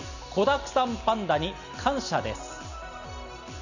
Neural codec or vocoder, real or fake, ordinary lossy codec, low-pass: none; real; none; 7.2 kHz